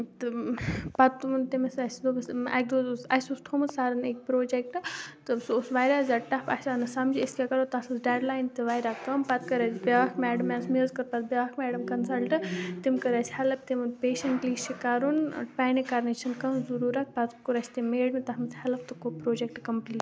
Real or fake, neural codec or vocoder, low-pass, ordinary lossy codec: real; none; none; none